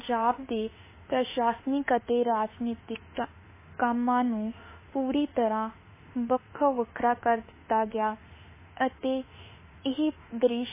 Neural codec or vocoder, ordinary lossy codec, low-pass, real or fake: autoencoder, 48 kHz, 32 numbers a frame, DAC-VAE, trained on Japanese speech; MP3, 16 kbps; 3.6 kHz; fake